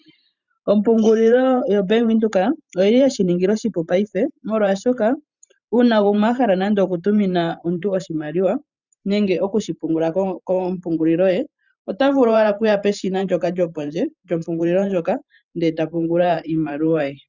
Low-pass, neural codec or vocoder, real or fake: 7.2 kHz; vocoder, 44.1 kHz, 128 mel bands every 512 samples, BigVGAN v2; fake